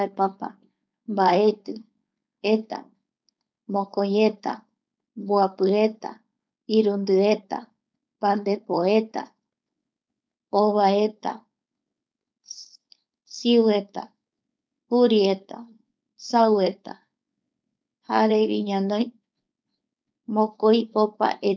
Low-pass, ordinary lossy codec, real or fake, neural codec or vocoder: none; none; fake; codec, 16 kHz, 4.8 kbps, FACodec